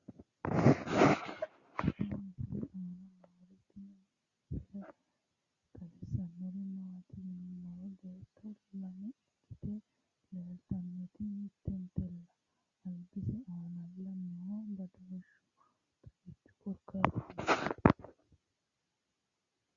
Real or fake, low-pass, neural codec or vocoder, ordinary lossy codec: real; 7.2 kHz; none; Opus, 64 kbps